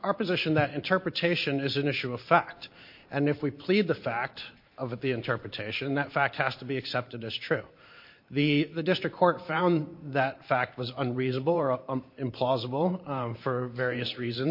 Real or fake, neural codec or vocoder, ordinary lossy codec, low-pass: fake; vocoder, 44.1 kHz, 128 mel bands every 512 samples, BigVGAN v2; MP3, 32 kbps; 5.4 kHz